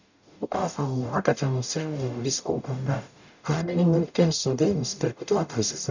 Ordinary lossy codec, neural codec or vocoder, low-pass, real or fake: none; codec, 44.1 kHz, 0.9 kbps, DAC; 7.2 kHz; fake